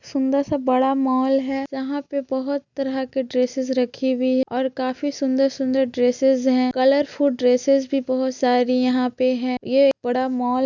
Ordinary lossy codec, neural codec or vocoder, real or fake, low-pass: none; none; real; 7.2 kHz